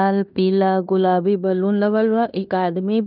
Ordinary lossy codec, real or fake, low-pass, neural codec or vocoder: none; fake; 5.4 kHz; codec, 16 kHz in and 24 kHz out, 0.9 kbps, LongCat-Audio-Codec, fine tuned four codebook decoder